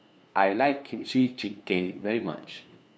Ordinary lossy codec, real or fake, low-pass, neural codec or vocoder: none; fake; none; codec, 16 kHz, 2 kbps, FunCodec, trained on LibriTTS, 25 frames a second